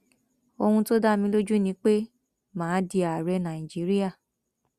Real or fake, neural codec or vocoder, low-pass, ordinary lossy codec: real; none; 14.4 kHz; Opus, 64 kbps